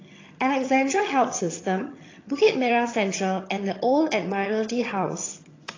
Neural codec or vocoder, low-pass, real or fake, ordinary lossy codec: vocoder, 22.05 kHz, 80 mel bands, HiFi-GAN; 7.2 kHz; fake; AAC, 32 kbps